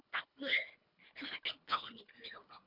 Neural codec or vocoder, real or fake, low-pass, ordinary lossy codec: codec, 24 kHz, 1.5 kbps, HILCodec; fake; 5.4 kHz; MP3, 48 kbps